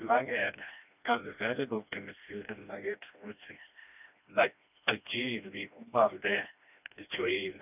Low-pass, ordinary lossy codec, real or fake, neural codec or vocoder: 3.6 kHz; none; fake; codec, 16 kHz, 1 kbps, FreqCodec, smaller model